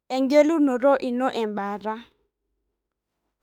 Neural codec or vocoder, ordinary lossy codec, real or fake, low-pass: autoencoder, 48 kHz, 32 numbers a frame, DAC-VAE, trained on Japanese speech; none; fake; 19.8 kHz